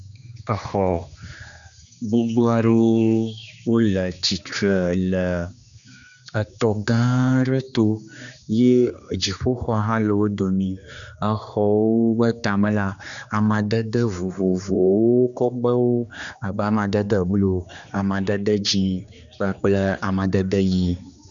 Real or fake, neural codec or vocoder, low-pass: fake; codec, 16 kHz, 2 kbps, X-Codec, HuBERT features, trained on general audio; 7.2 kHz